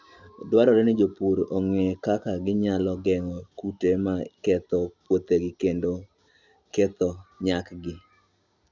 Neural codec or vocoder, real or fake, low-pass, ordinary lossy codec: none; real; 7.2 kHz; Opus, 64 kbps